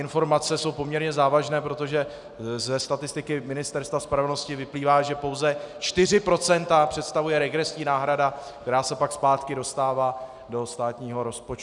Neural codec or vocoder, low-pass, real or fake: none; 10.8 kHz; real